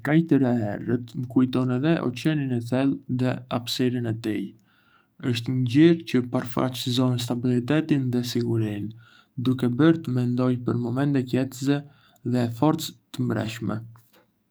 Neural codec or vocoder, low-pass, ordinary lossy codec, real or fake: codec, 44.1 kHz, 7.8 kbps, DAC; none; none; fake